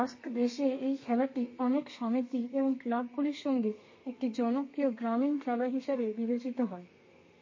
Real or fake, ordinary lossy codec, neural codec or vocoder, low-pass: fake; MP3, 32 kbps; codec, 32 kHz, 1.9 kbps, SNAC; 7.2 kHz